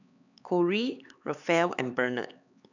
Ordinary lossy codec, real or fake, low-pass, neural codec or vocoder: none; fake; 7.2 kHz; codec, 16 kHz, 4 kbps, X-Codec, HuBERT features, trained on LibriSpeech